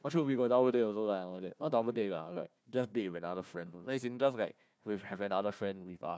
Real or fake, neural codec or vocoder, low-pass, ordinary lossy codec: fake; codec, 16 kHz, 1 kbps, FunCodec, trained on Chinese and English, 50 frames a second; none; none